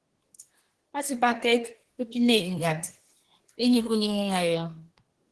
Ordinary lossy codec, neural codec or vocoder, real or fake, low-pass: Opus, 16 kbps; codec, 24 kHz, 1 kbps, SNAC; fake; 10.8 kHz